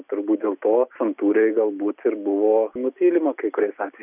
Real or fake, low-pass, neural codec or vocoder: real; 3.6 kHz; none